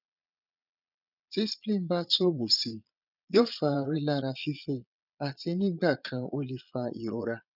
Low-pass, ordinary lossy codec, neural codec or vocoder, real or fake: 5.4 kHz; none; vocoder, 22.05 kHz, 80 mel bands, Vocos; fake